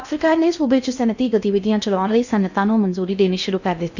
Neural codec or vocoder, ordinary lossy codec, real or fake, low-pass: codec, 16 kHz in and 24 kHz out, 0.6 kbps, FocalCodec, streaming, 2048 codes; none; fake; 7.2 kHz